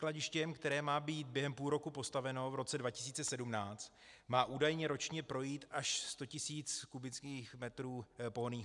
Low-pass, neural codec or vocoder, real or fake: 10.8 kHz; none; real